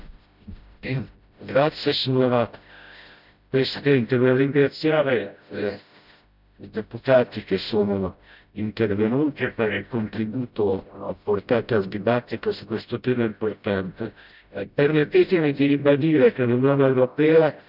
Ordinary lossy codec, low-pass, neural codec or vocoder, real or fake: none; 5.4 kHz; codec, 16 kHz, 0.5 kbps, FreqCodec, smaller model; fake